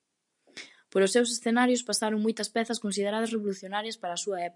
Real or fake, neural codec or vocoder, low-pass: real; none; 10.8 kHz